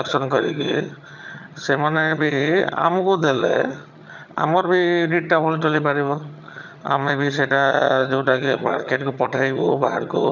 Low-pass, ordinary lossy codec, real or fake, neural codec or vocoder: 7.2 kHz; none; fake; vocoder, 22.05 kHz, 80 mel bands, HiFi-GAN